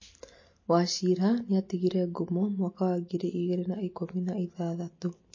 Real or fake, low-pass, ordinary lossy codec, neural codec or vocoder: real; 7.2 kHz; MP3, 32 kbps; none